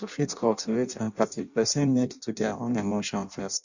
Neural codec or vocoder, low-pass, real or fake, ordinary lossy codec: codec, 16 kHz in and 24 kHz out, 0.6 kbps, FireRedTTS-2 codec; 7.2 kHz; fake; none